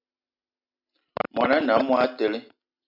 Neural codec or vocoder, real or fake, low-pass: none; real; 5.4 kHz